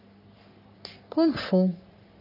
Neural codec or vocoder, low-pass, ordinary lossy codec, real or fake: codec, 44.1 kHz, 3.4 kbps, Pupu-Codec; 5.4 kHz; none; fake